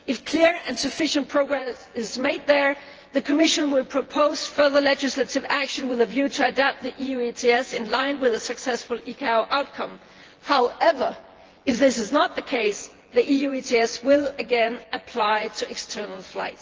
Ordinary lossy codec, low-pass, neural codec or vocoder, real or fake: Opus, 16 kbps; 7.2 kHz; vocoder, 24 kHz, 100 mel bands, Vocos; fake